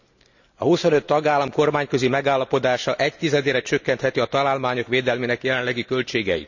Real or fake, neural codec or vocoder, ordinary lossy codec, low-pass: real; none; none; 7.2 kHz